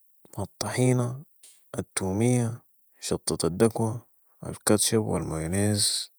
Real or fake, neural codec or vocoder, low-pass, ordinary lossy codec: fake; vocoder, 48 kHz, 128 mel bands, Vocos; none; none